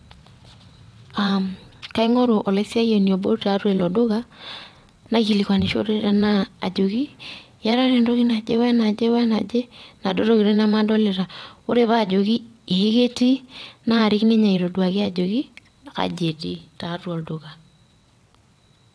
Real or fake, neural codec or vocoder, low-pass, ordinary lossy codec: fake; vocoder, 22.05 kHz, 80 mel bands, WaveNeXt; none; none